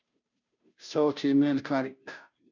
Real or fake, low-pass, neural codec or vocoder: fake; 7.2 kHz; codec, 16 kHz, 0.5 kbps, FunCodec, trained on Chinese and English, 25 frames a second